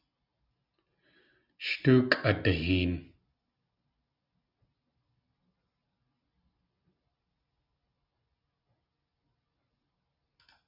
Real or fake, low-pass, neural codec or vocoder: real; 5.4 kHz; none